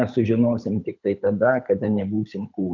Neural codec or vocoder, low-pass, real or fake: codec, 24 kHz, 6 kbps, HILCodec; 7.2 kHz; fake